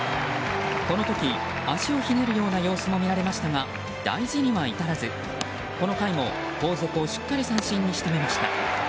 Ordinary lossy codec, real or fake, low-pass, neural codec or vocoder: none; real; none; none